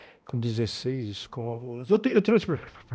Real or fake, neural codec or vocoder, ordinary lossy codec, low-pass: fake; codec, 16 kHz, 1 kbps, X-Codec, HuBERT features, trained on balanced general audio; none; none